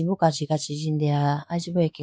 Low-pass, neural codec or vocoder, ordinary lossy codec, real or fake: none; codec, 16 kHz, 4 kbps, X-Codec, WavLM features, trained on Multilingual LibriSpeech; none; fake